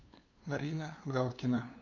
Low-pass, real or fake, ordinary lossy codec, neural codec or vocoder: 7.2 kHz; fake; none; codec, 16 kHz, 2 kbps, FunCodec, trained on LibriTTS, 25 frames a second